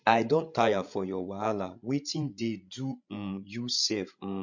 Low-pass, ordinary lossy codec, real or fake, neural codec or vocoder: 7.2 kHz; MP3, 48 kbps; fake; codec, 16 kHz, 16 kbps, FreqCodec, larger model